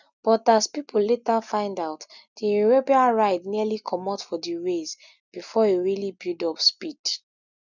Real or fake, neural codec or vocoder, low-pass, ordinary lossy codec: real; none; 7.2 kHz; none